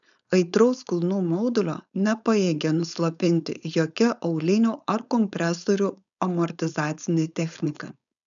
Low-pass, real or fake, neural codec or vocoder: 7.2 kHz; fake; codec, 16 kHz, 4.8 kbps, FACodec